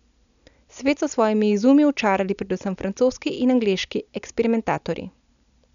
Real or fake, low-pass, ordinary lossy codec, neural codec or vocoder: real; 7.2 kHz; none; none